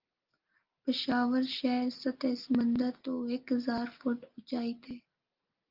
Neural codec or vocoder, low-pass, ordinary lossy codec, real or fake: none; 5.4 kHz; Opus, 24 kbps; real